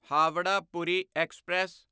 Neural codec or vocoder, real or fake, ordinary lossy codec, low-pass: none; real; none; none